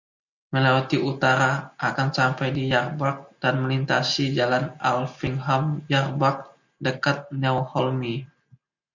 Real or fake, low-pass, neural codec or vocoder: real; 7.2 kHz; none